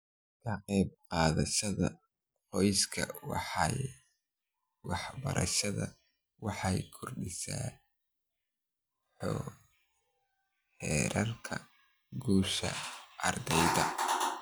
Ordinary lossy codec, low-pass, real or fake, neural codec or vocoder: none; none; real; none